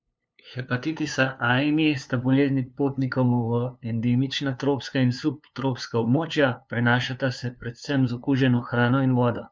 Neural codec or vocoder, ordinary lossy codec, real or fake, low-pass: codec, 16 kHz, 2 kbps, FunCodec, trained on LibriTTS, 25 frames a second; none; fake; none